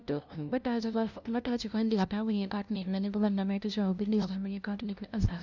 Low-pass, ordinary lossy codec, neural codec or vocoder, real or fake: 7.2 kHz; none; codec, 16 kHz, 0.5 kbps, FunCodec, trained on LibriTTS, 25 frames a second; fake